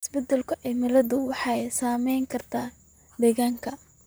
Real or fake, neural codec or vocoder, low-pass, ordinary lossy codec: fake; vocoder, 44.1 kHz, 128 mel bands, Pupu-Vocoder; none; none